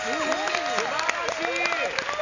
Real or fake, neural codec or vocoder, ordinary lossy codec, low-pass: real; none; none; 7.2 kHz